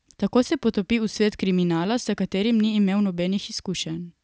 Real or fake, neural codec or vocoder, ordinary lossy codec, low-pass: real; none; none; none